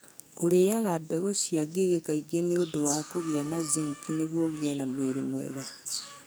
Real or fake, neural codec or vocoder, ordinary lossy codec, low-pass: fake; codec, 44.1 kHz, 2.6 kbps, SNAC; none; none